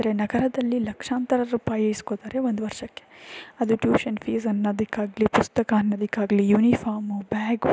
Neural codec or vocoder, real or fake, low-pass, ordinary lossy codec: none; real; none; none